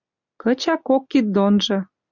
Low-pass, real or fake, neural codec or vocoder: 7.2 kHz; real; none